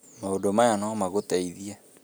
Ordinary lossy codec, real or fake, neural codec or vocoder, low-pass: none; real; none; none